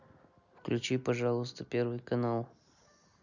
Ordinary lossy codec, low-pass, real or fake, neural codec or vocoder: none; 7.2 kHz; real; none